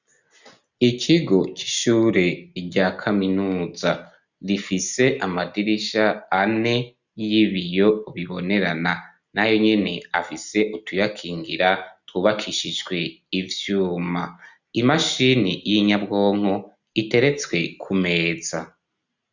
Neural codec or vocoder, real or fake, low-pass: none; real; 7.2 kHz